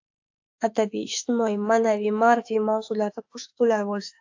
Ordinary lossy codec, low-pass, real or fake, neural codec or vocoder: AAC, 48 kbps; 7.2 kHz; fake; autoencoder, 48 kHz, 32 numbers a frame, DAC-VAE, trained on Japanese speech